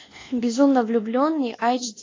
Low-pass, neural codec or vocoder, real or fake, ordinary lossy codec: 7.2 kHz; codec, 24 kHz, 1.2 kbps, DualCodec; fake; AAC, 32 kbps